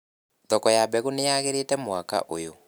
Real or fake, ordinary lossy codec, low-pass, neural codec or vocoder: real; none; none; none